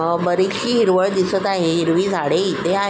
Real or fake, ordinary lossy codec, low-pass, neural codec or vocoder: real; none; none; none